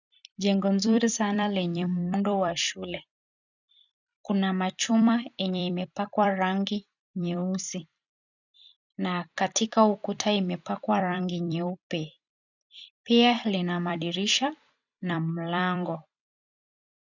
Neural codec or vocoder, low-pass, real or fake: vocoder, 44.1 kHz, 128 mel bands every 256 samples, BigVGAN v2; 7.2 kHz; fake